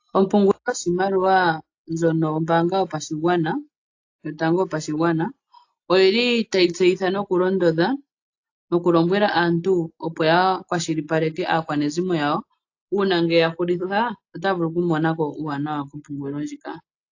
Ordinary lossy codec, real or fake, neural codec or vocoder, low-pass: AAC, 48 kbps; real; none; 7.2 kHz